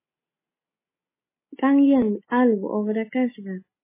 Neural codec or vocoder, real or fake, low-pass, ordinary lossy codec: none; real; 3.6 kHz; MP3, 16 kbps